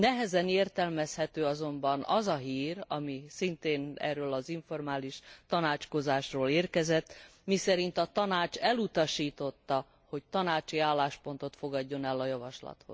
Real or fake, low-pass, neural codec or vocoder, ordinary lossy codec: real; none; none; none